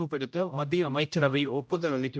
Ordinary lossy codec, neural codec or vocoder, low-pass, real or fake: none; codec, 16 kHz, 0.5 kbps, X-Codec, HuBERT features, trained on general audio; none; fake